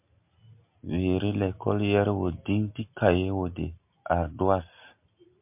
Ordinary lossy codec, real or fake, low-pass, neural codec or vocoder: MP3, 32 kbps; real; 3.6 kHz; none